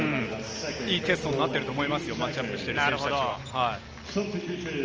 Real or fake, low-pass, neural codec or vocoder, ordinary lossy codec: real; 7.2 kHz; none; Opus, 24 kbps